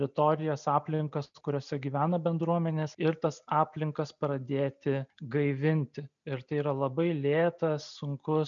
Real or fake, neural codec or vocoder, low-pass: real; none; 7.2 kHz